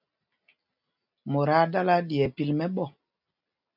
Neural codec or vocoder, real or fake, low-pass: none; real; 5.4 kHz